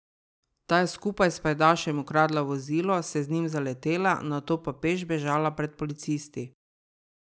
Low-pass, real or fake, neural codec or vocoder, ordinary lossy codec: none; real; none; none